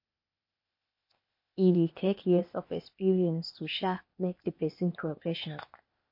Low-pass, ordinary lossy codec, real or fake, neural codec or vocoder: 5.4 kHz; MP3, 32 kbps; fake; codec, 16 kHz, 0.8 kbps, ZipCodec